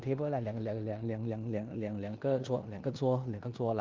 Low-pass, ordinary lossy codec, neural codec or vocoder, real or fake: 7.2 kHz; Opus, 24 kbps; codec, 16 kHz in and 24 kHz out, 0.9 kbps, LongCat-Audio-Codec, four codebook decoder; fake